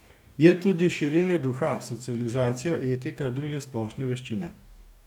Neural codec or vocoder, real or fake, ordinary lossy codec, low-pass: codec, 44.1 kHz, 2.6 kbps, DAC; fake; none; 19.8 kHz